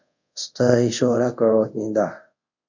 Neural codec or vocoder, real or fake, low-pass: codec, 24 kHz, 0.5 kbps, DualCodec; fake; 7.2 kHz